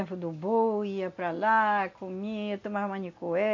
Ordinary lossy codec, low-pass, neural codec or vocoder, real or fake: AAC, 48 kbps; 7.2 kHz; none; real